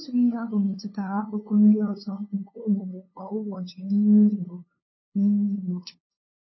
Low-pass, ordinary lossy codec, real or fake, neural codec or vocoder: 7.2 kHz; MP3, 24 kbps; fake; codec, 16 kHz, 2 kbps, FunCodec, trained on LibriTTS, 25 frames a second